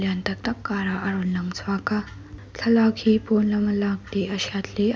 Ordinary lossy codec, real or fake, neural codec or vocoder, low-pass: none; real; none; none